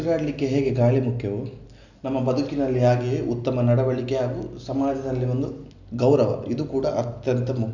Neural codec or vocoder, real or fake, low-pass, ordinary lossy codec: none; real; 7.2 kHz; none